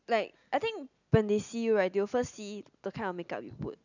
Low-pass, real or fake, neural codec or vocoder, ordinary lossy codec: 7.2 kHz; real; none; none